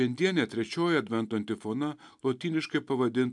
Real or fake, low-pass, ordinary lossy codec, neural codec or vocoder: real; 10.8 kHz; MP3, 96 kbps; none